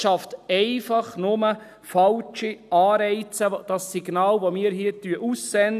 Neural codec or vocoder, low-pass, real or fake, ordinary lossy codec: none; 14.4 kHz; real; none